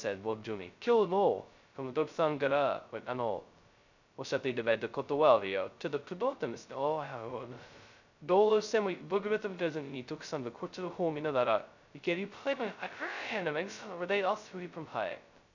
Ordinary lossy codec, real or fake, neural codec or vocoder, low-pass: none; fake; codec, 16 kHz, 0.2 kbps, FocalCodec; 7.2 kHz